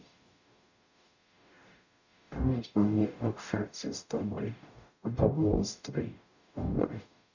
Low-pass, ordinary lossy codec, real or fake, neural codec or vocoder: 7.2 kHz; none; fake; codec, 44.1 kHz, 0.9 kbps, DAC